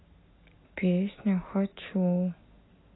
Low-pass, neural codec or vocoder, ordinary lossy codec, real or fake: 7.2 kHz; none; AAC, 16 kbps; real